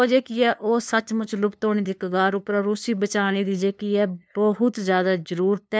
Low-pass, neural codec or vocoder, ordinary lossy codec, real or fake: none; codec, 16 kHz, 2 kbps, FunCodec, trained on LibriTTS, 25 frames a second; none; fake